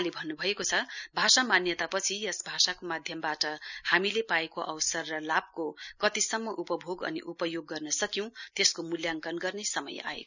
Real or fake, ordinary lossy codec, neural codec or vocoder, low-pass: real; none; none; 7.2 kHz